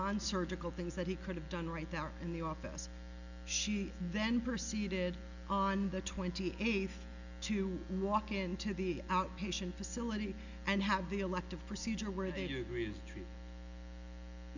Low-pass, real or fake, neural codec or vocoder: 7.2 kHz; real; none